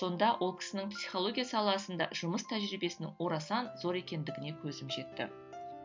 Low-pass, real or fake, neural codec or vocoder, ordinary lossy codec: 7.2 kHz; real; none; MP3, 64 kbps